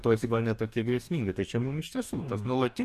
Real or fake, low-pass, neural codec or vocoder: fake; 14.4 kHz; codec, 44.1 kHz, 2.6 kbps, DAC